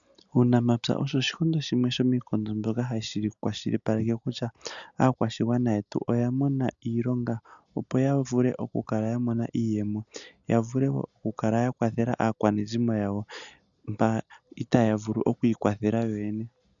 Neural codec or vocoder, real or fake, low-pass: none; real; 7.2 kHz